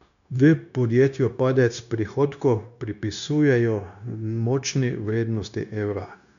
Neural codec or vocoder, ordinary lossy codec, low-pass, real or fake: codec, 16 kHz, 0.9 kbps, LongCat-Audio-Codec; none; 7.2 kHz; fake